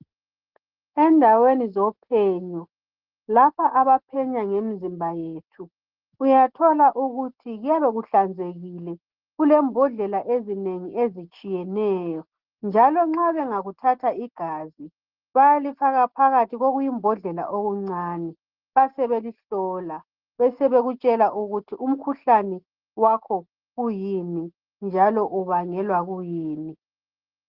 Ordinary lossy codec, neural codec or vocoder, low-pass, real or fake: Opus, 16 kbps; none; 5.4 kHz; real